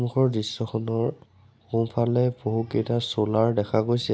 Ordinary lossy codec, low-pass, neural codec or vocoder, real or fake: none; none; none; real